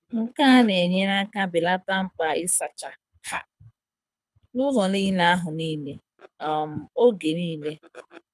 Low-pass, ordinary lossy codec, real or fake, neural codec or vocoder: none; none; fake; codec, 24 kHz, 6 kbps, HILCodec